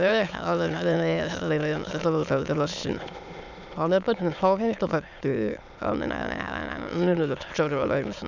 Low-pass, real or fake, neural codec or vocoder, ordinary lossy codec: 7.2 kHz; fake; autoencoder, 22.05 kHz, a latent of 192 numbers a frame, VITS, trained on many speakers; none